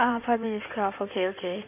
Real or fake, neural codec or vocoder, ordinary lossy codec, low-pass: fake; codec, 16 kHz in and 24 kHz out, 2.2 kbps, FireRedTTS-2 codec; none; 3.6 kHz